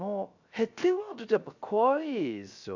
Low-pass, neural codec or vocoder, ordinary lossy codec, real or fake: 7.2 kHz; codec, 16 kHz, 0.3 kbps, FocalCodec; none; fake